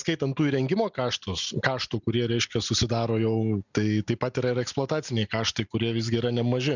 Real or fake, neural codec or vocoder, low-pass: real; none; 7.2 kHz